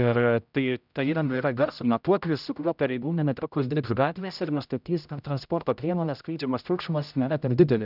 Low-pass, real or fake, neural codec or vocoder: 5.4 kHz; fake; codec, 16 kHz, 0.5 kbps, X-Codec, HuBERT features, trained on general audio